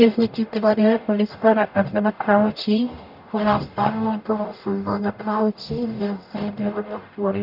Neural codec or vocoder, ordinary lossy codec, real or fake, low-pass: codec, 44.1 kHz, 0.9 kbps, DAC; none; fake; 5.4 kHz